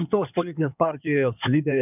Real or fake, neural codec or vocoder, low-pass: fake; codec, 16 kHz in and 24 kHz out, 2.2 kbps, FireRedTTS-2 codec; 3.6 kHz